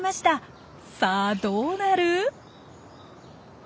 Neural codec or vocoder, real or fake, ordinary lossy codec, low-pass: none; real; none; none